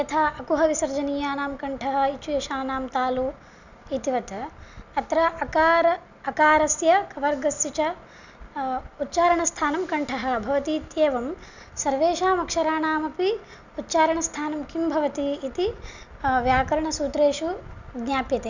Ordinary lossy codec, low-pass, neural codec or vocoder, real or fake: none; 7.2 kHz; none; real